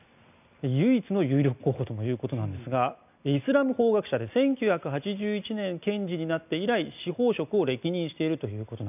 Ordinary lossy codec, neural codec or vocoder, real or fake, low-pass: none; none; real; 3.6 kHz